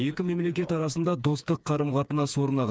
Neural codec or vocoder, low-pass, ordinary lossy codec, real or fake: codec, 16 kHz, 4 kbps, FreqCodec, smaller model; none; none; fake